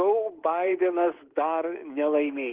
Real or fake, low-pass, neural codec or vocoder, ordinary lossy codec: fake; 3.6 kHz; codec, 24 kHz, 3.1 kbps, DualCodec; Opus, 16 kbps